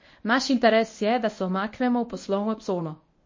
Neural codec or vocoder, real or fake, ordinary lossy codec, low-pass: codec, 24 kHz, 0.9 kbps, WavTokenizer, medium speech release version 1; fake; MP3, 32 kbps; 7.2 kHz